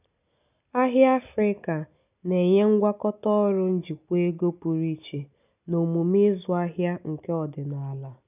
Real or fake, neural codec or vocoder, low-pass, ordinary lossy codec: real; none; 3.6 kHz; none